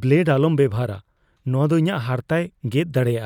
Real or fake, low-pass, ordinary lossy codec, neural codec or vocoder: real; 19.8 kHz; none; none